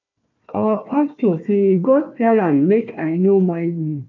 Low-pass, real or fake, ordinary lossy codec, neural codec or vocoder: 7.2 kHz; fake; none; codec, 16 kHz, 1 kbps, FunCodec, trained on Chinese and English, 50 frames a second